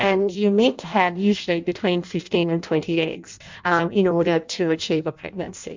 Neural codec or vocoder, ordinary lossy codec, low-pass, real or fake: codec, 16 kHz in and 24 kHz out, 0.6 kbps, FireRedTTS-2 codec; MP3, 64 kbps; 7.2 kHz; fake